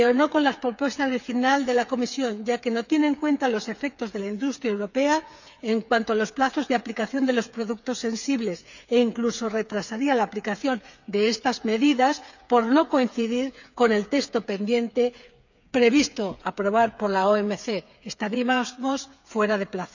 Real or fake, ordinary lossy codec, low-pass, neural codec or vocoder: fake; none; 7.2 kHz; codec, 16 kHz, 8 kbps, FreqCodec, smaller model